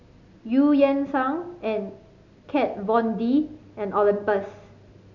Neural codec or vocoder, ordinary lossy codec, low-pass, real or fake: none; none; 7.2 kHz; real